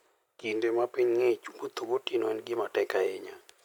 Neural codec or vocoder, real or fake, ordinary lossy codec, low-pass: none; real; none; 19.8 kHz